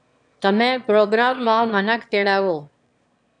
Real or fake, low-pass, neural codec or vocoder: fake; 9.9 kHz; autoencoder, 22.05 kHz, a latent of 192 numbers a frame, VITS, trained on one speaker